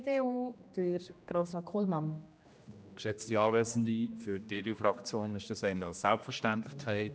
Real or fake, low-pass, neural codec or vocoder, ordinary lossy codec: fake; none; codec, 16 kHz, 1 kbps, X-Codec, HuBERT features, trained on general audio; none